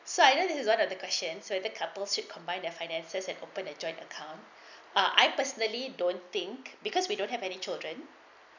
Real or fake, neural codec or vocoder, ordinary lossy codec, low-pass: real; none; none; 7.2 kHz